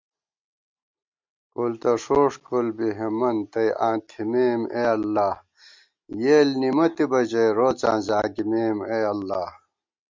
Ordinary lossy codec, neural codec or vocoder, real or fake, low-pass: MP3, 64 kbps; none; real; 7.2 kHz